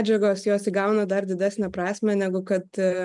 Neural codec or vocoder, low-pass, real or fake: none; 10.8 kHz; real